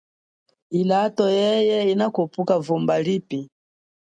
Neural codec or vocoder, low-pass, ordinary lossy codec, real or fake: none; 9.9 kHz; MP3, 64 kbps; real